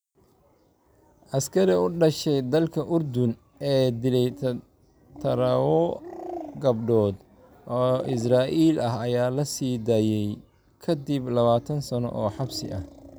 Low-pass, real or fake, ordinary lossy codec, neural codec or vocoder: none; real; none; none